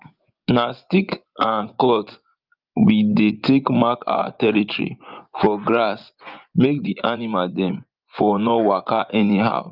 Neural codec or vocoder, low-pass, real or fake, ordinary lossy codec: vocoder, 24 kHz, 100 mel bands, Vocos; 5.4 kHz; fake; Opus, 32 kbps